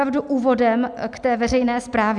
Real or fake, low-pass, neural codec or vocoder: real; 10.8 kHz; none